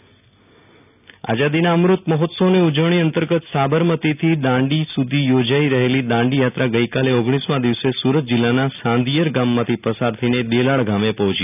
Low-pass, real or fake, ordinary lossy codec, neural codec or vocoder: 3.6 kHz; real; none; none